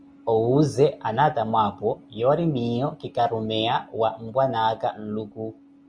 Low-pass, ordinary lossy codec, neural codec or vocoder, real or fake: 9.9 kHz; Opus, 64 kbps; none; real